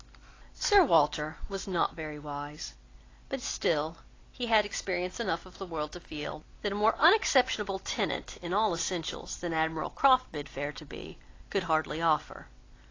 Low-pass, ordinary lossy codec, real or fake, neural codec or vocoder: 7.2 kHz; AAC, 32 kbps; real; none